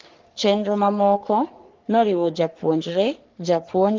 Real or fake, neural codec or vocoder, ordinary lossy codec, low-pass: fake; codec, 44.1 kHz, 3.4 kbps, Pupu-Codec; Opus, 16 kbps; 7.2 kHz